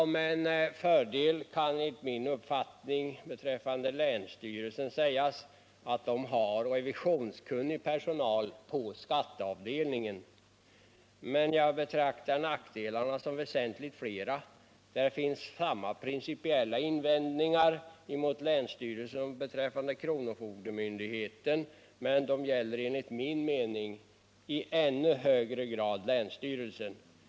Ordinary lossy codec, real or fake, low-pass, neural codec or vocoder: none; real; none; none